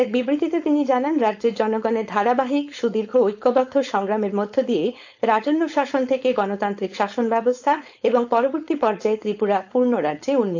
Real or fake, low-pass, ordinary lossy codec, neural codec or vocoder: fake; 7.2 kHz; AAC, 48 kbps; codec, 16 kHz, 4.8 kbps, FACodec